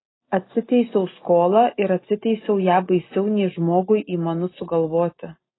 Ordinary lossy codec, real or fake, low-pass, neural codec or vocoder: AAC, 16 kbps; real; 7.2 kHz; none